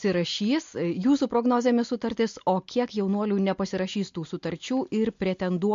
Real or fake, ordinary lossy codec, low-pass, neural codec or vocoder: real; MP3, 48 kbps; 7.2 kHz; none